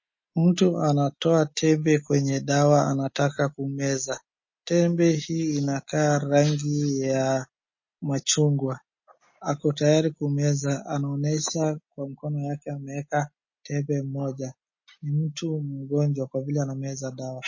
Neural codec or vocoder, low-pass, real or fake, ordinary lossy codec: none; 7.2 kHz; real; MP3, 32 kbps